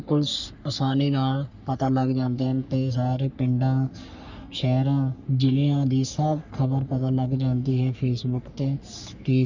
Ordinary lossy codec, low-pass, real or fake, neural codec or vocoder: none; 7.2 kHz; fake; codec, 44.1 kHz, 3.4 kbps, Pupu-Codec